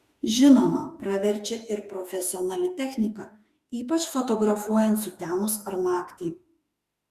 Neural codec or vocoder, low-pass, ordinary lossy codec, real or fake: autoencoder, 48 kHz, 32 numbers a frame, DAC-VAE, trained on Japanese speech; 14.4 kHz; Opus, 64 kbps; fake